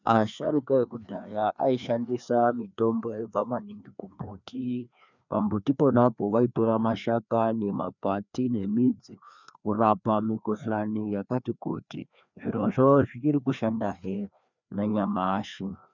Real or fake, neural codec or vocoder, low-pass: fake; codec, 16 kHz, 2 kbps, FreqCodec, larger model; 7.2 kHz